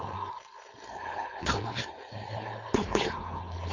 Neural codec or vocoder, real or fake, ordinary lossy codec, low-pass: codec, 16 kHz, 4.8 kbps, FACodec; fake; AAC, 48 kbps; 7.2 kHz